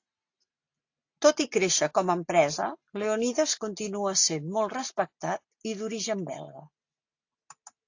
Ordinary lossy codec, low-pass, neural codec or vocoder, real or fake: AAC, 48 kbps; 7.2 kHz; none; real